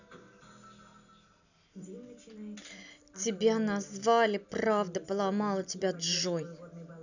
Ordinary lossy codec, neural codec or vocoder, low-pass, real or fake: none; none; 7.2 kHz; real